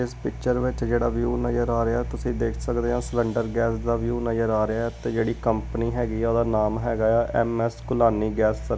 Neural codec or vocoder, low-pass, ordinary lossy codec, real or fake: none; none; none; real